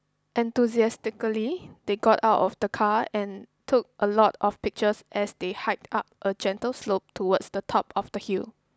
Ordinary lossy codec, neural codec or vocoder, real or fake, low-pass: none; none; real; none